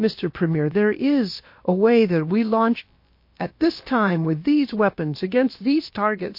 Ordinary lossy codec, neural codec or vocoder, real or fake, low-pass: MP3, 32 kbps; codec, 16 kHz, about 1 kbps, DyCAST, with the encoder's durations; fake; 5.4 kHz